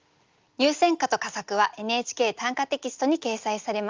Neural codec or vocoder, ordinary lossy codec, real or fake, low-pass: none; Opus, 32 kbps; real; 7.2 kHz